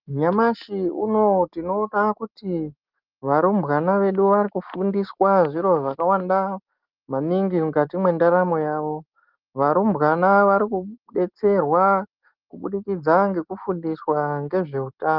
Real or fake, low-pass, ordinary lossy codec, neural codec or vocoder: real; 5.4 kHz; Opus, 32 kbps; none